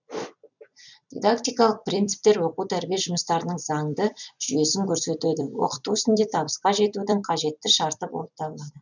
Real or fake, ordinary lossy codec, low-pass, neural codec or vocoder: fake; none; 7.2 kHz; vocoder, 44.1 kHz, 128 mel bands every 256 samples, BigVGAN v2